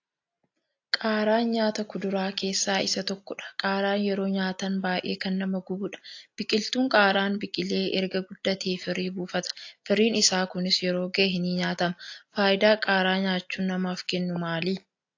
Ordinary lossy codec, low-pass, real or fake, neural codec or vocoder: AAC, 48 kbps; 7.2 kHz; real; none